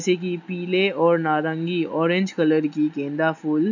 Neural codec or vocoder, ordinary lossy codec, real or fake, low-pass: none; none; real; 7.2 kHz